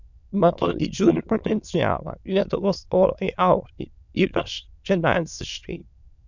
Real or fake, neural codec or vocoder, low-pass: fake; autoencoder, 22.05 kHz, a latent of 192 numbers a frame, VITS, trained on many speakers; 7.2 kHz